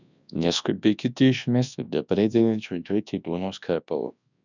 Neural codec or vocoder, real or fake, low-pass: codec, 24 kHz, 0.9 kbps, WavTokenizer, large speech release; fake; 7.2 kHz